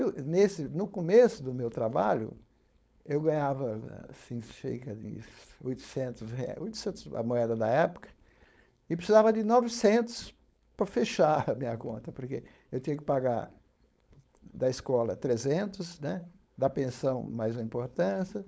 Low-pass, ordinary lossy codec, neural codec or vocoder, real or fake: none; none; codec, 16 kHz, 4.8 kbps, FACodec; fake